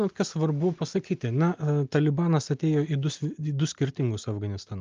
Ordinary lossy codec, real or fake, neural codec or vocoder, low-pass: Opus, 24 kbps; real; none; 7.2 kHz